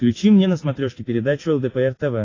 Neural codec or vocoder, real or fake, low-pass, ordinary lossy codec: none; real; 7.2 kHz; AAC, 32 kbps